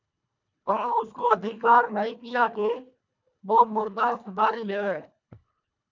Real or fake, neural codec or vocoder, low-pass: fake; codec, 24 kHz, 1.5 kbps, HILCodec; 7.2 kHz